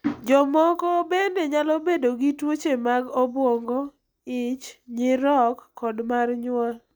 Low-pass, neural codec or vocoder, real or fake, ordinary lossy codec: none; none; real; none